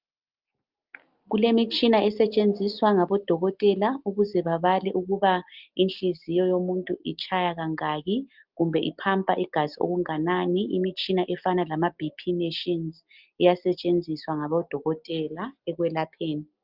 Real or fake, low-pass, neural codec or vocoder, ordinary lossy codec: real; 5.4 kHz; none; Opus, 24 kbps